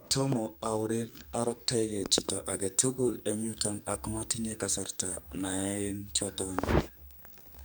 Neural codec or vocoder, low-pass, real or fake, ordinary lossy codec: codec, 44.1 kHz, 2.6 kbps, SNAC; none; fake; none